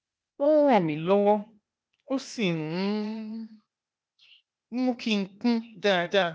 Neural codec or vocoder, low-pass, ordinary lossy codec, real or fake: codec, 16 kHz, 0.8 kbps, ZipCodec; none; none; fake